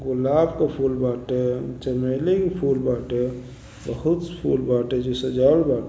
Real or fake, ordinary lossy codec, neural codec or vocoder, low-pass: real; none; none; none